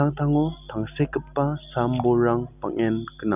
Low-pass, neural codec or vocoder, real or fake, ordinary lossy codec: 3.6 kHz; none; real; none